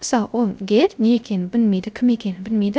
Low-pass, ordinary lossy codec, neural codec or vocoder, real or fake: none; none; codec, 16 kHz, 0.3 kbps, FocalCodec; fake